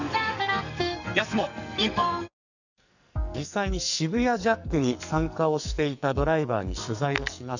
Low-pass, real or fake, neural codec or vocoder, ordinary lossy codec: 7.2 kHz; fake; codec, 44.1 kHz, 2.6 kbps, SNAC; none